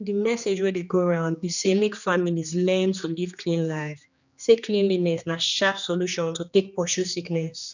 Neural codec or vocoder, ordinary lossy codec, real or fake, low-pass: codec, 16 kHz, 2 kbps, X-Codec, HuBERT features, trained on general audio; none; fake; 7.2 kHz